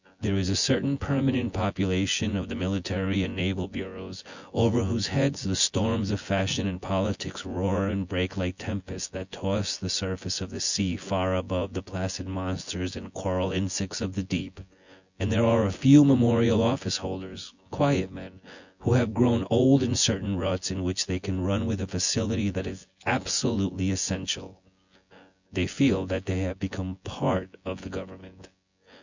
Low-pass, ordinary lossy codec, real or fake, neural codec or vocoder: 7.2 kHz; Opus, 64 kbps; fake; vocoder, 24 kHz, 100 mel bands, Vocos